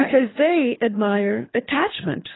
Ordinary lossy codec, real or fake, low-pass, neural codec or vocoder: AAC, 16 kbps; fake; 7.2 kHz; codec, 24 kHz, 3 kbps, HILCodec